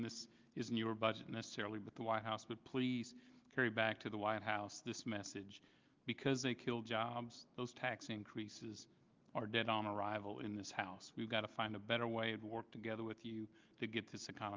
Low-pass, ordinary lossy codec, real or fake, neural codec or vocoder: 7.2 kHz; Opus, 32 kbps; real; none